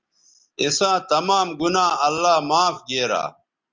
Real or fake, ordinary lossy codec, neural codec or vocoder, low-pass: real; Opus, 32 kbps; none; 7.2 kHz